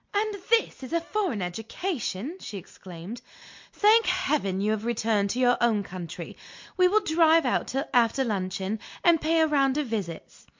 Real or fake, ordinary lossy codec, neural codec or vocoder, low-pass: real; MP3, 48 kbps; none; 7.2 kHz